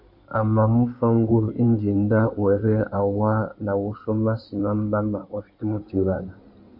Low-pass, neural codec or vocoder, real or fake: 5.4 kHz; codec, 16 kHz in and 24 kHz out, 2.2 kbps, FireRedTTS-2 codec; fake